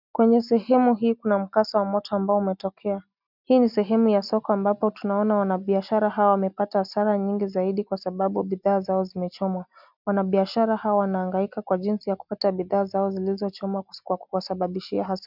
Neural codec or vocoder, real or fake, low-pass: none; real; 5.4 kHz